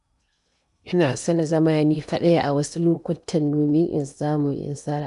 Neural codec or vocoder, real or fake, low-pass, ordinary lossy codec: codec, 16 kHz in and 24 kHz out, 0.8 kbps, FocalCodec, streaming, 65536 codes; fake; 10.8 kHz; none